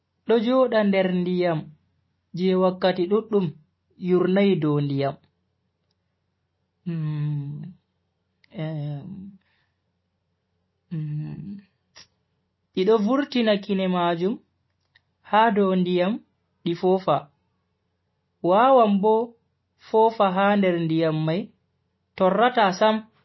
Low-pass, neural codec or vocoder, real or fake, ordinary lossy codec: 7.2 kHz; none; real; MP3, 24 kbps